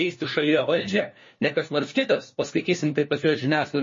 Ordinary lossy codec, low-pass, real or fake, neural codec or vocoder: MP3, 32 kbps; 7.2 kHz; fake; codec, 16 kHz, 1 kbps, FunCodec, trained on LibriTTS, 50 frames a second